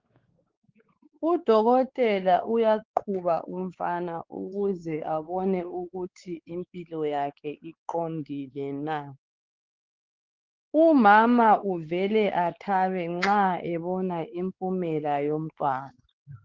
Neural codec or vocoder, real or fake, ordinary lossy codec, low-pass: codec, 16 kHz, 4 kbps, X-Codec, WavLM features, trained on Multilingual LibriSpeech; fake; Opus, 16 kbps; 7.2 kHz